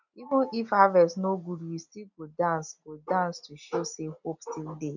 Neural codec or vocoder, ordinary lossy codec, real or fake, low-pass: none; none; real; 7.2 kHz